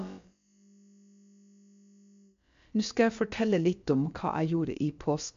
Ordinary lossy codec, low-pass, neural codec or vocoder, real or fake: MP3, 64 kbps; 7.2 kHz; codec, 16 kHz, about 1 kbps, DyCAST, with the encoder's durations; fake